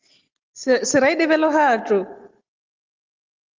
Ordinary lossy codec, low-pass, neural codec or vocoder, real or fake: Opus, 32 kbps; 7.2 kHz; none; real